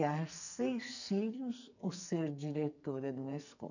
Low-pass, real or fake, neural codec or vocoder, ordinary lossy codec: 7.2 kHz; fake; codec, 44.1 kHz, 2.6 kbps, SNAC; none